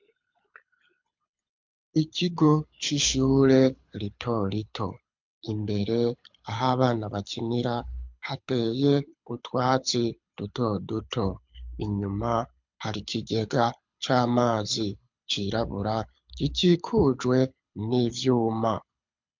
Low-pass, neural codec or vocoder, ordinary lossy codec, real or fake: 7.2 kHz; codec, 24 kHz, 6 kbps, HILCodec; MP3, 64 kbps; fake